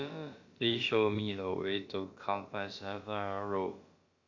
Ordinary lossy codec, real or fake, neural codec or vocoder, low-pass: Opus, 64 kbps; fake; codec, 16 kHz, about 1 kbps, DyCAST, with the encoder's durations; 7.2 kHz